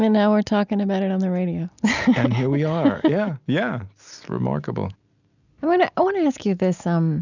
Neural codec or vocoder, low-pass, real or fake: none; 7.2 kHz; real